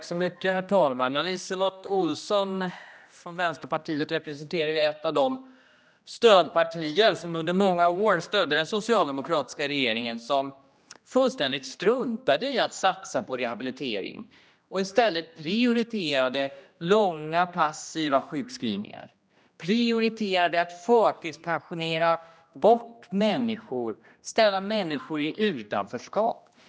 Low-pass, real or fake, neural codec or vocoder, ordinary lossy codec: none; fake; codec, 16 kHz, 1 kbps, X-Codec, HuBERT features, trained on general audio; none